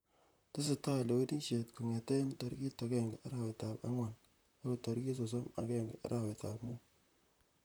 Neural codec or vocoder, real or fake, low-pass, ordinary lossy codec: vocoder, 44.1 kHz, 128 mel bands, Pupu-Vocoder; fake; none; none